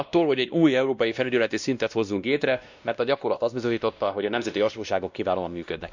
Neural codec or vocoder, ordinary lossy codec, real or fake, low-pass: codec, 16 kHz, 1 kbps, X-Codec, WavLM features, trained on Multilingual LibriSpeech; none; fake; 7.2 kHz